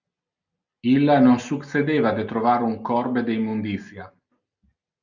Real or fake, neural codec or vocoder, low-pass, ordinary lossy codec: real; none; 7.2 kHz; Opus, 64 kbps